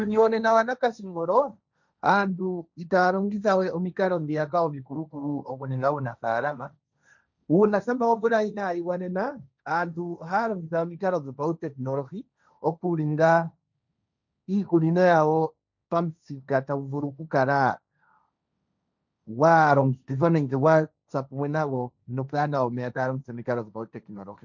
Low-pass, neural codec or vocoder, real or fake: 7.2 kHz; codec, 16 kHz, 1.1 kbps, Voila-Tokenizer; fake